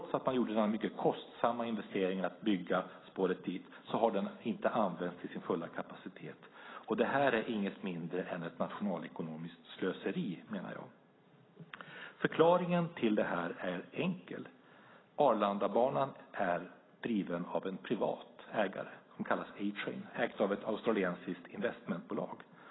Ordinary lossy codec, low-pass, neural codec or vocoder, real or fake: AAC, 16 kbps; 7.2 kHz; none; real